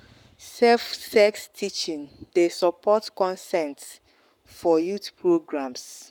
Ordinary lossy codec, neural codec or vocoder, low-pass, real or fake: none; codec, 44.1 kHz, 7.8 kbps, Pupu-Codec; 19.8 kHz; fake